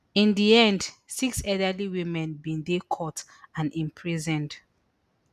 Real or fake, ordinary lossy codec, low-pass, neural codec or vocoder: real; none; 14.4 kHz; none